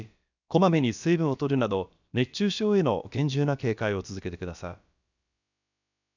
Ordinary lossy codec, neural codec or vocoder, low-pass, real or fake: none; codec, 16 kHz, about 1 kbps, DyCAST, with the encoder's durations; 7.2 kHz; fake